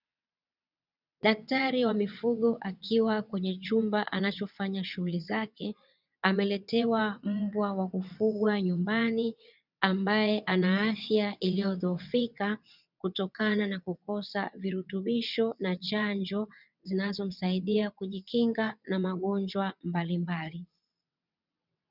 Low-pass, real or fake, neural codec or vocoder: 5.4 kHz; fake; vocoder, 22.05 kHz, 80 mel bands, WaveNeXt